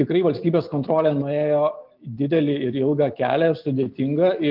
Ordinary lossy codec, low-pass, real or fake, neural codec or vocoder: Opus, 32 kbps; 5.4 kHz; real; none